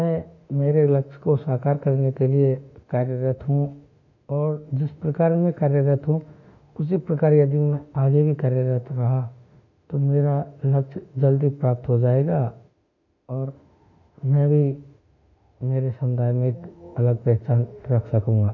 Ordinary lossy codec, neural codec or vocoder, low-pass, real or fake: none; autoencoder, 48 kHz, 32 numbers a frame, DAC-VAE, trained on Japanese speech; 7.2 kHz; fake